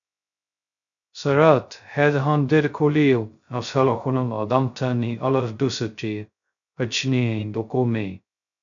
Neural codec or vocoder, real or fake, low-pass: codec, 16 kHz, 0.2 kbps, FocalCodec; fake; 7.2 kHz